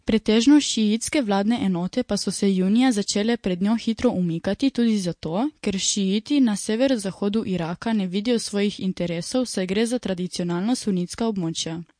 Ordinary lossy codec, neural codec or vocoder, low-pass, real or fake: MP3, 48 kbps; none; 9.9 kHz; real